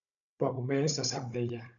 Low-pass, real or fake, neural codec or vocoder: 7.2 kHz; fake; codec, 16 kHz, 16 kbps, FunCodec, trained on Chinese and English, 50 frames a second